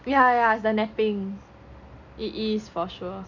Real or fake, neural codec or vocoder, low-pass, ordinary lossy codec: real; none; 7.2 kHz; none